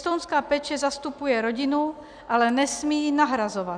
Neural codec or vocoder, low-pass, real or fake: none; 9.9 kHz; real